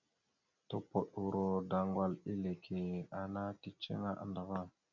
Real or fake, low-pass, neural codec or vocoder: real; 7.2 kHz; none